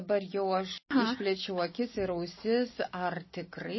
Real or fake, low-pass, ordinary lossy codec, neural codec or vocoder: real; 7.2 kHz; MP3, 24 kbps; none